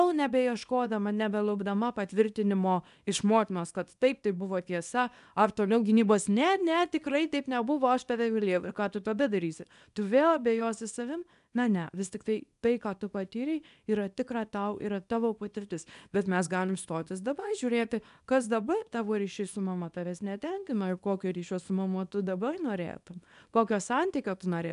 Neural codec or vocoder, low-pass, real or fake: codec, 24 kHz, 0.9 kbps, WavTokenizer, small release; 10.8 kHz; fake